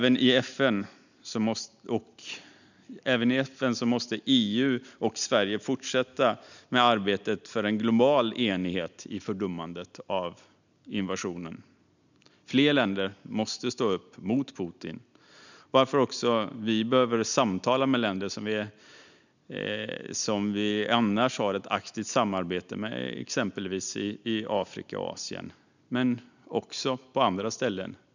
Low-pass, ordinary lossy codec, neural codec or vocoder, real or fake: 7.2 kHz; none; none; real